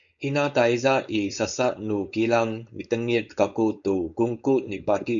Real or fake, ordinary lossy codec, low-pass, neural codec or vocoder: fake; MP3, 96 kbps; 7.2 kHz; codec, 16 kHz, 4.8 kbps, FACodec